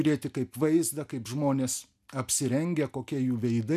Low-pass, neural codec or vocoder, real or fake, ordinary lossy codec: 14.4 kHz; none; real; MP3, 96 kbps